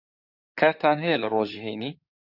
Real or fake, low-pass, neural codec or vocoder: fake; 5.4 kHz; codec, 16 kHz in and 24 kHz out, 2.2 kbps, FireRedTTS-2 codec